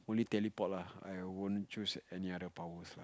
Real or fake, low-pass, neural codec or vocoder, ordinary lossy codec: real; none; none; none